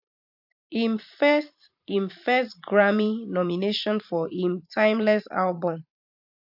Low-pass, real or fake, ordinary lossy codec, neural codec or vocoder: 5.4 kHz; fake; AAC, 48 kbps; vocoder, 44.1 kHz, 128 mel bands every 512 samples, BigVGAN v2